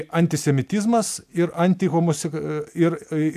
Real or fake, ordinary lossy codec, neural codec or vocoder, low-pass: real; AAC, 96 kbps; none; 14.4 kHz